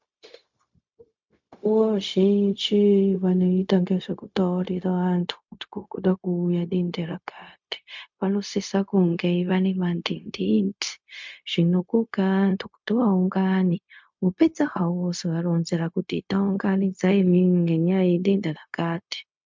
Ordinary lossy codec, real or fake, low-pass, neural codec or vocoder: MP3, 64 kbps; fake; 7.2 kHz; codec, 16 kHz, 0.4 kbps, LongCat-Audio-Codec